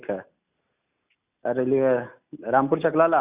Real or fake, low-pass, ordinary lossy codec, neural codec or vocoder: real; 3.6 kHz; Opus, 64 kbps; none